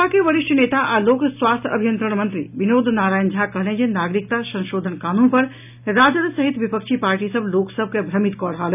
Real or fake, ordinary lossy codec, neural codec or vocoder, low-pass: real; none; none; 3.6 kHz